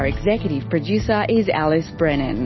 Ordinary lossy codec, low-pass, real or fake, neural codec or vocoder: MP3, 24 kbps; 7.2 kHz; real; none